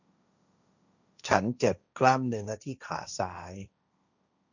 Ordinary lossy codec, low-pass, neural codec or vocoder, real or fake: none; 7.2 kHz; codec, 16 kHz, 1.1 kbps, Voila-Tokenizer; fake